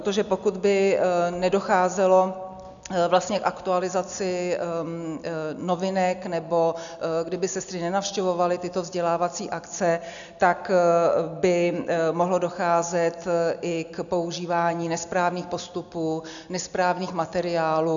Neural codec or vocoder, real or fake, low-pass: none; real; 7.2 kHz